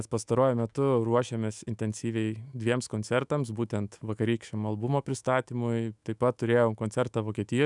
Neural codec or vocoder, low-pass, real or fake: autoencoder, 48 kHz, 128 numbers a frame, DAC-VAE, trained on Japanese speech; 10.8 kHz; fake